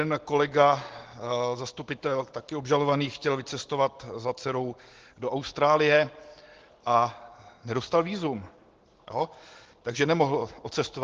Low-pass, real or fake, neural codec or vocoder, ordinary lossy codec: 7.2 kHz; real; none; Opus, 16 kbps